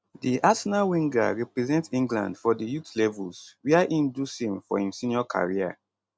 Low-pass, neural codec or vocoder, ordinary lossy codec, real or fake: none; none; none; real